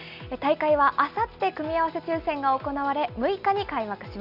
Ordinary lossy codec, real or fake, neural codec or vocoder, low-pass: none; real; none; 5.4 kHz